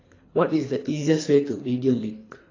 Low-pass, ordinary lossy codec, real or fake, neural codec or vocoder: 7.2 kHz; AAC, 32 kbps; fake; codec, 24 kHz, 3 kbps, HILCodec